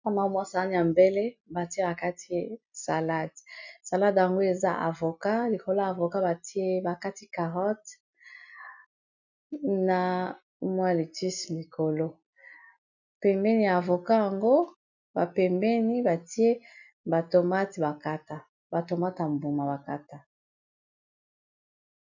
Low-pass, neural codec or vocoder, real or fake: 7.2 kHz; none; real